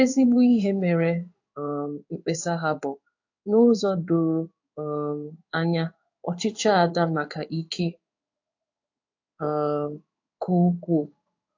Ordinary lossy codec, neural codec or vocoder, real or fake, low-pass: AAC, 48 kbps; codec, 16 kHz in and 24 kHz out, 1 kbps, XY-Tokenizer; fake; 7.2 kHz